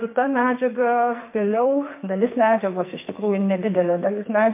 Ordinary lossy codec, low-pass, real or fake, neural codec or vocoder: MP3, 24 kbps; 3.6 kHz; fake; codec, 44.1 kHz, 2.6 kbps, SNAC